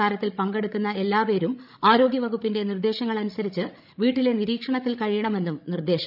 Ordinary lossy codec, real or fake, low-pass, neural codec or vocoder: none; fake; 5.4 kHz; codec, 16 kHz, 16 kbps, FreqCodec, larger model